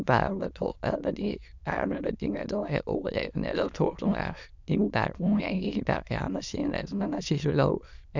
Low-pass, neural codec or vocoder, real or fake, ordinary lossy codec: 7.2 kHz; autoencoder, 22.05 kHz, a latent of 192 numbers a frame, VITS, trained on many speakers; fake; none